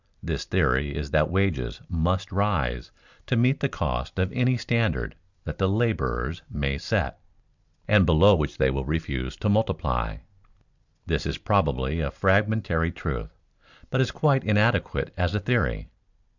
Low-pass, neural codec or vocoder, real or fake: 7.2 kHz; vocoder, 44.1 kHz, 128 mel bands every 256 samples, BigVGAN v2; fake